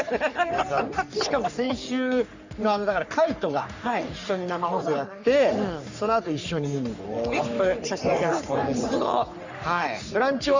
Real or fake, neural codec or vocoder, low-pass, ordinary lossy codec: fake; codec, 44.1 kHz, 3.4 kbps, Pupu-Codec; 7.2 kHz; Opus, 64 kbps